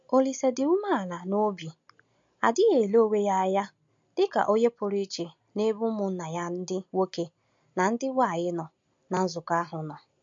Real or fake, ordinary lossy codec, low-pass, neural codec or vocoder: real; MP3, 48 kbps; 7.2 kHz; none